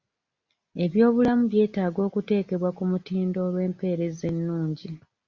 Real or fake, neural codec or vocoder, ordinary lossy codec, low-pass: real; none; Opus, 64 kbps; 7.2 kHz